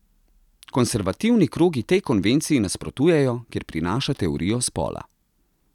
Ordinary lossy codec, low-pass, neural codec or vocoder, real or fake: none; 19.8 kHz; none; real